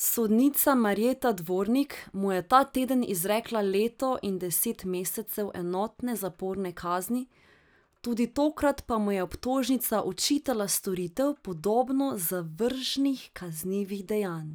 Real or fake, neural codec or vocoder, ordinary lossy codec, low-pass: real; none; none; none